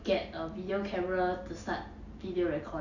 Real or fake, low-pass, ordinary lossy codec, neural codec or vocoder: real; 7.2 kHz; none; none